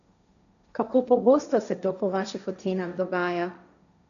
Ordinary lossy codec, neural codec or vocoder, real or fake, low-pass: none; codec, 16 kHz, 1.1 kbps, Voila-Tokenizer; fake; 7.2 kHz